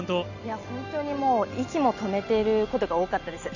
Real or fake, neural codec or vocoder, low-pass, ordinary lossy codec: real; none; 7.2 kHz; none